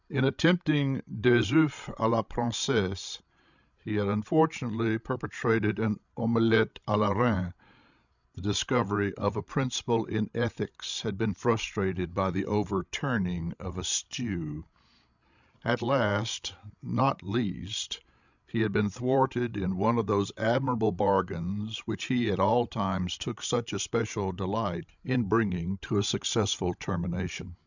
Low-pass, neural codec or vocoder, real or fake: 7.2 kHz; codec, 16 kHz, 16 kbps, FreqCodec, larger model; fake